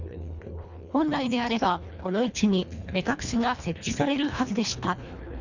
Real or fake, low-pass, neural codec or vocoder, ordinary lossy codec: fake; 7.2 kHz; codec, 24 kHz, 1.5 kbps, HILCodec; none